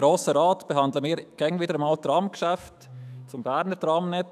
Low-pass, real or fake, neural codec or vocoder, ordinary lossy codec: 14.4 kHz; fake; autoencoder, 48 kHz, 128 numbers a frame, DAC-VAE, trained on Japanese speech; none